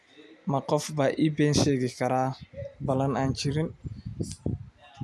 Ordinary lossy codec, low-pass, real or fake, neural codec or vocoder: none; none; real; none